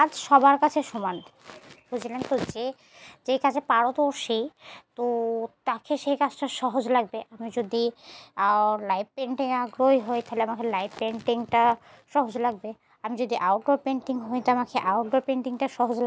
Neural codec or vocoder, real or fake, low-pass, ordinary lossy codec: none; real; none; none